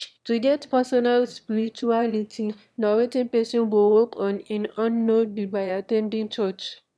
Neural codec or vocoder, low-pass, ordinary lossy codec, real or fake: autoencoder, 22.05 kHz, a latent of 192 numbers a frame, VITS, trained on one speaker; none; none; fake